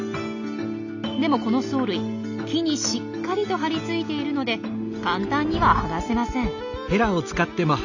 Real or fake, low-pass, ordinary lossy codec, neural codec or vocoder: real; 7.2 kHz; none; none